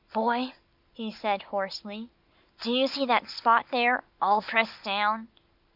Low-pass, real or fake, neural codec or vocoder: 5.4 kHz; real; none